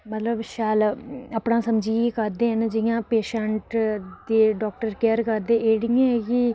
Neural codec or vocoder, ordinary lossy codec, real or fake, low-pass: none; none; real; none